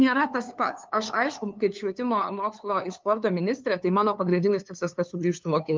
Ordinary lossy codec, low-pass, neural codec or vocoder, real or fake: Opus, 32 kbps; 7.2 kHz; codec, 16 kHz, 2 kbps, FunCodec, trained on LibriTTS, 25 frames a second; fake